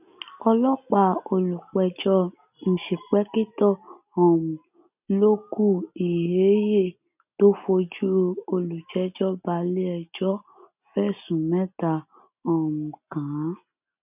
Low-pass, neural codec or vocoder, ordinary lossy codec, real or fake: 3.6 kHz; none; none; real